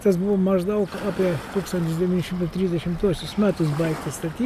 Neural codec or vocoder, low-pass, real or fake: none; 14.4 kHz; real